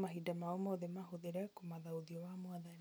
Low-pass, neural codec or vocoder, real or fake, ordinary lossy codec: none; none; real; none